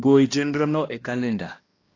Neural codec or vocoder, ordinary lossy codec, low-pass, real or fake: codec, 16 kHz, 1 kbps, X-Codec, HuBERT features, trained on balanced general audio; AAC, 32 kbps; 7.2 kHz; fake